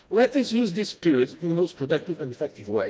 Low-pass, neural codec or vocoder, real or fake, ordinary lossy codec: none; codec, 16 kHz, 1 kbps, FreqCodec, smaller model; fake; none